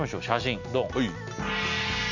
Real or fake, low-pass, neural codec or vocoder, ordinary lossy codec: real; 7.2 kHz; none; none